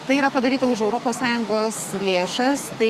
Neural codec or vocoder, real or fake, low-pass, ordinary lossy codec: codec, 44.1 kHz, 2.6 kbps, SNAC; fake; 14.4 kHz; Opus, 64 kbps